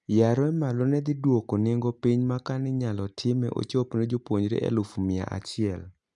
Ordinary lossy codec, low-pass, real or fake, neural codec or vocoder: none; 10.8 kHz; real; none